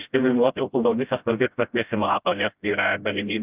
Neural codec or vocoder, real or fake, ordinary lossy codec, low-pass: codec, 16 kHz, 0.5 kbps, FreqCodec, smaller model; fake; Opus, 24 kbps; 3.6 kHz